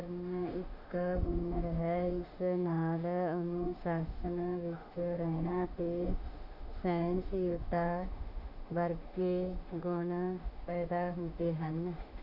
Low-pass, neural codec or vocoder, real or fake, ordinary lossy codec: 5.4 kHz; autoencoder, 48 kHz, 32 numbers a frame, DAC-VAE, trained on Japanese speech; fake; none